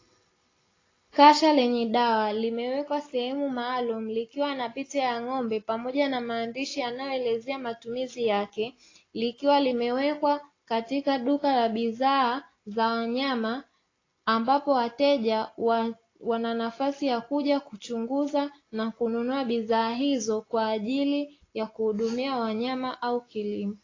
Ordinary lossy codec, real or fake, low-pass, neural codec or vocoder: AAC, 32 kbps; real; 7.2 kHz; none